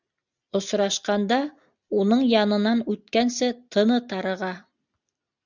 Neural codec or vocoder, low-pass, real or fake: none; 7.2 kHz; real